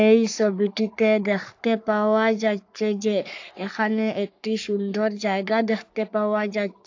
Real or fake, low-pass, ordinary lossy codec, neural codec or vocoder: fake; 7.2 kHz; AAC, 48 kbps; codec, 44.1 kHz, 3.4 kbps, Pupu-Codec